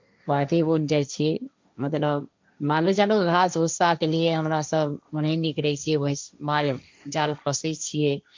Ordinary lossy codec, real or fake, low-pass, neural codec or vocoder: MP3, 64 kbps; fake; 7.2 kHz; codec, 16 kHz, 1.1 kbps, Voila-Tokenizer